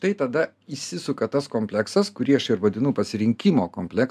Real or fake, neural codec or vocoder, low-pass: real; none; 14.4 kHz